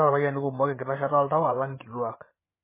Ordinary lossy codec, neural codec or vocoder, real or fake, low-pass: MP3, 16 kbps; vocoder, 24 kHz, 100 mel bands, Vocos; fake; 3.6 kHz